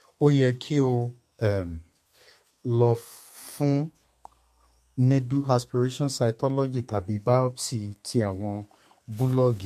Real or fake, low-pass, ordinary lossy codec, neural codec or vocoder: fake; 14.4 kHz; MP3, 64 kbps; codec, 32 kHz, 1.9 kbps, SNAC